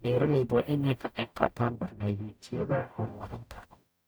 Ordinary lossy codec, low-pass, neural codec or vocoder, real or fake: none; none; codec, 44.1 kHz, 0.9 kbps, DAC; fake